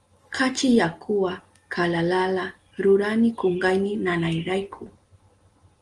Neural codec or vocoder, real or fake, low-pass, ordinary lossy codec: none; real; 10.8 kHz; Opus, 24 kbps